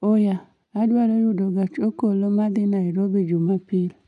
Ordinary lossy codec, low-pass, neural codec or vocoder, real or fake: none; 10.8 kHz; none; real